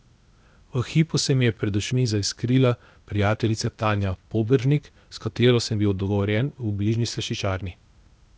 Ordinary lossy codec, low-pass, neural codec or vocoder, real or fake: none; none; codec, 16 kHz, 0.8 kbps, ZipCodec; fake